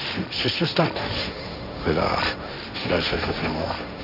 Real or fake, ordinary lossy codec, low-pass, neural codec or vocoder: fake; none; 5.4 kHz; codec, 16 kHz, 1.1 kbps, Voila-Tokenizer